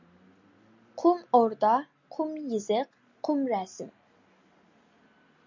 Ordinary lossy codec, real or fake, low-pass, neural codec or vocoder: MP3, 64 kbps; real; 7.2 kHz; none